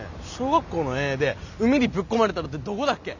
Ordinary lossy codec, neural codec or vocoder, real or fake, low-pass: none; none; real; 7.2 kHz